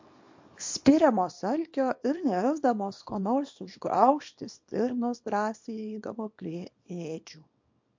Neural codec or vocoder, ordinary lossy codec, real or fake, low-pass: codec, 24 kHz, 0.9 kbps, WavTokenizer, small release; MP3, 48 kbps; fake; 7.2 kHz